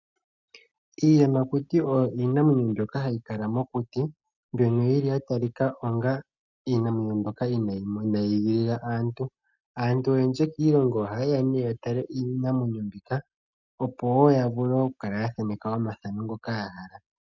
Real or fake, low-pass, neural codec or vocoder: real; 7.2 kHz; none